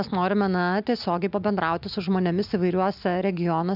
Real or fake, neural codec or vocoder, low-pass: real; none; 5.4 kHz